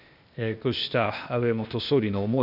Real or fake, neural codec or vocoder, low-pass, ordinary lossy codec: fake; codec, 16 kHz, 0.8 kbps, ZipCodec; 5.4 kHz; none